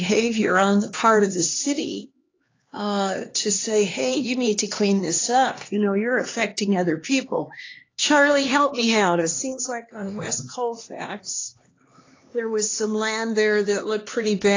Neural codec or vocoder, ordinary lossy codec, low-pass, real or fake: codec, 16 kHz, 2 kbps, X-Codec, HuBERT features, trained on LibriSpeech; AAC, 32 kbps; 7.2 kHz; fake